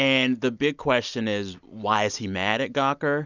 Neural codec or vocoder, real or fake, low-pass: none; real; 7.2 kHz